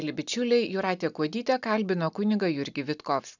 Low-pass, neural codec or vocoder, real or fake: 7.2 kHz; none; real